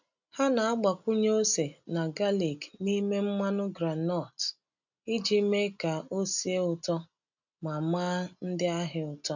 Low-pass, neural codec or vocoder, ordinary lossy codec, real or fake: 7.2 kHz; none; none; real